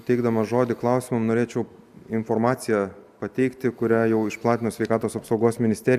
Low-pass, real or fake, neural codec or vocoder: 14.4 kHz; real; none